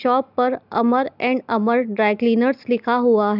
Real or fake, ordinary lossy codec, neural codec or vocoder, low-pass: real; none; none; 5.4 kHz